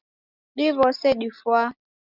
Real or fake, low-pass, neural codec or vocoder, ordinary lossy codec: real; 5.4 kHz; none; Opus, 64 kbps